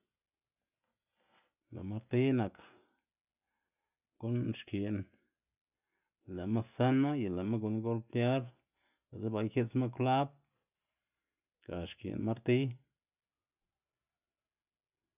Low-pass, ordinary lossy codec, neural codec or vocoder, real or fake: 3.6 kHz; none; none; real